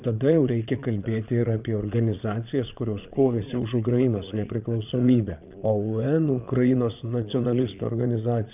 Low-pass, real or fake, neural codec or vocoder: 3.6 kHz; fake; vocoder, 22.05 kHz, 80 mel bands, Vocos